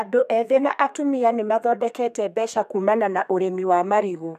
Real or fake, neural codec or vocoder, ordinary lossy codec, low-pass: fake; codec, 32 kHz, 1.9 kbps, SNAC; none; 14.4 kHz